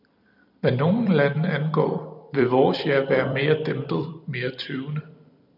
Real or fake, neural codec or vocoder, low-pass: fake; vocoder, 44.1 kHz, 128 mel bands every 256 samples, BigVGAN v2; 5.4 kHz